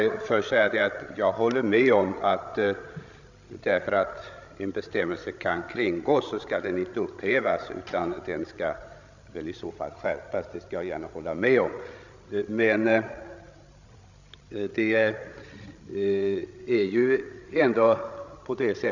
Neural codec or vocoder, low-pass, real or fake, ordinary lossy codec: codec, 16 kHz, 16 kbps, FreqCodec, larger model; 7.2 kHz; fake; none